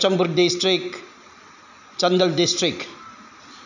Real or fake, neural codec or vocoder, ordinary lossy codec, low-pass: real; none; none; 7.2 kHz